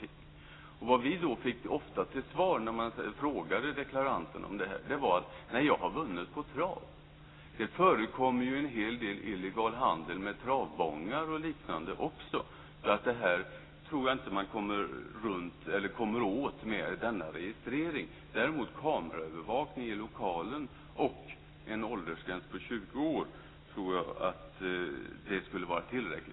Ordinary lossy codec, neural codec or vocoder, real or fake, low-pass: AAC, 16 kbps; none; real; 7.2 kHz